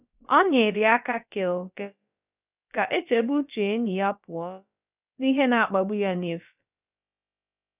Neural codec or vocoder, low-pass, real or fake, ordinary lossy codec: codec, 16 kHz, about 1 kbps, DyCAST, with the encoder's durations; 3.6 kHz; fake; none